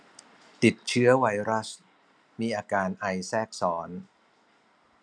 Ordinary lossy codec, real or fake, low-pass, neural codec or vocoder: none; real; none; none